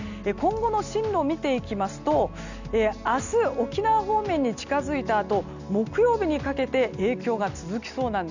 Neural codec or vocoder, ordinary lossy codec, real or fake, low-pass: none; none; real; 7.2 kHz